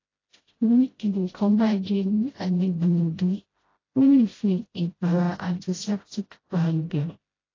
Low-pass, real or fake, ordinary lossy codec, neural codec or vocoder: 7.2 kHz; fake; AAC, 32 kbps; codec, 16 kHz, 0.5 kbps, FreqCodec, smaller model